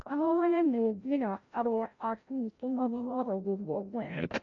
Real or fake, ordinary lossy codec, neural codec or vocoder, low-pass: fake; MP3, 48 kbps; codec, 16 kHz, 0.5 kbps, FreqCodec, larger model; 7.2 kHz